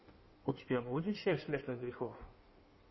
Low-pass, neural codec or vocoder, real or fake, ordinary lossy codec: 7.2 kHz; codec, 16 kHz in and 24 kHz out, 1.1 kbps, FireRedTTS-2 codec; fake; MP3, 24 kbps